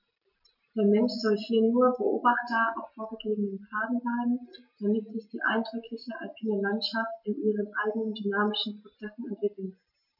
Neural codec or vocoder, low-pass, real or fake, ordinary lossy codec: none; 5.4 kHz; real; none